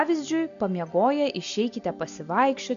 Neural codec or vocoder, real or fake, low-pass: none; real; 7.2 kHz